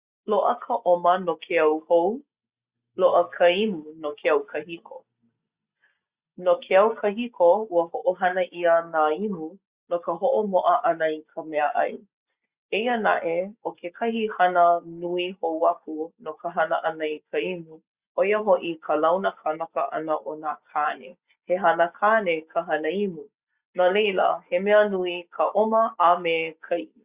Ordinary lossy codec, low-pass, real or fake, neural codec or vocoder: Opus, 64 kbps; 3.6 kHz; real; none